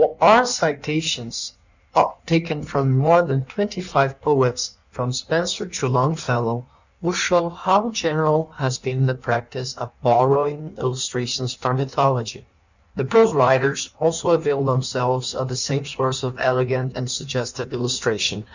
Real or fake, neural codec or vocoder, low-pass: fake; codec, 16 kHz in and 24 kHz out, 1.1 kbps, FireRedTTS-2 codec; 7.2 kHz